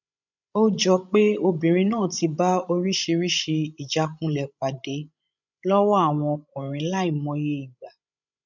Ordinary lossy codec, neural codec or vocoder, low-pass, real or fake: none; codec, 16 kHz, 16 kbps, FreqCodec, larger model; 7.2 kHz; fake